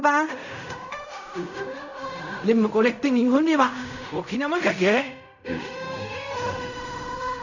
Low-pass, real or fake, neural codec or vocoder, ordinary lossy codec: 7.2 kHz; fake; codec, 16 kHz in and 24 kHz out, 0.4 kbps, LongCat-Audio-Codec, fine tuned four codebook decoder; none